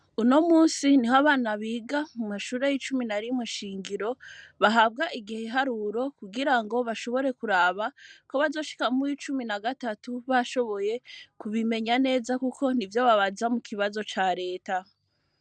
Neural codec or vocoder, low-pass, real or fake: none; 9.9 kHz; real